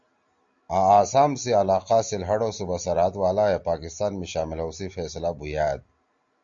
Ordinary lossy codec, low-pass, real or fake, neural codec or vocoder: Opus, 64 kbps; 7.2 kHz; real; none